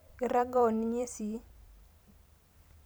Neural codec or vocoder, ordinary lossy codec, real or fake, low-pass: vocoder, 44.1 kHz, 128 mel bands every 512 samples, BigVGAN v2; none; fake; none